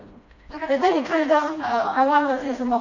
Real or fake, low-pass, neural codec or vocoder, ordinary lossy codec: fake; 7.2 kHz; codec, 16 kHz, 1 kbps, FreqCodec, smaller model; none